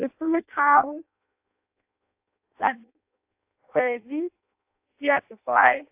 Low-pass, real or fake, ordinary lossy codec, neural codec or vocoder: 3.6 kHz; fake; AAC, 32 kbps; codec, 16 kHz in and 24 kHz out, 0.6 kbps, FireRedTTS-2 codec